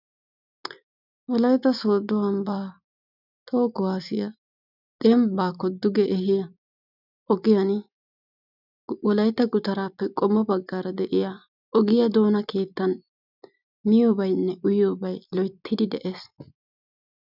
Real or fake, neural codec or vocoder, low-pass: real; none; 5.4 kHz